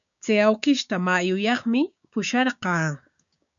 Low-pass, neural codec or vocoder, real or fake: 7.2 kHz; codec, 16 kHz, 6 kbps, DAC; fake